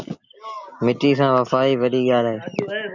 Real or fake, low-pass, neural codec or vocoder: real; 7.2 kHz; none